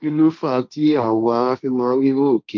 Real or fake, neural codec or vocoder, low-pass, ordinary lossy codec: fake; codec, 16 kHz, 1.1 kbps, Voila-Tokenizer; none; none